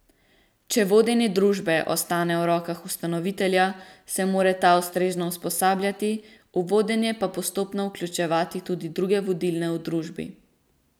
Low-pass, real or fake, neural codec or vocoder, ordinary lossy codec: none; real; none; none